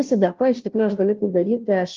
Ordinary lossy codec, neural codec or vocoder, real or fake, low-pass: Opus, 24 kbps; codec, 16 kHz, 0.5 kbps, FunCodec, trained on Chinese and English, 25 frames a second; fake; 7.2 kHz